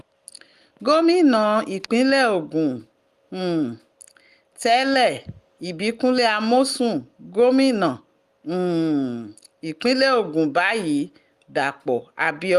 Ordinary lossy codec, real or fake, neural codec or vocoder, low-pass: Opus, 32 kbps; real; none; 14.4 kHz